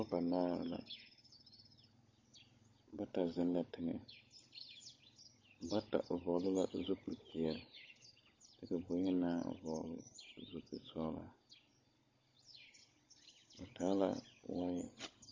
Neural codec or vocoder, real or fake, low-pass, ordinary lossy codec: codec, 16 kHz, 16 kbps, FunCodec, trained on Chinese and English, 50 frames a second; fake; 7.2 kHz; MP3, 32 kbps